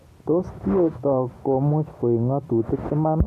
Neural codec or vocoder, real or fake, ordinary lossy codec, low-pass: none; real; AAC, 96 kbps; 14.4 kHz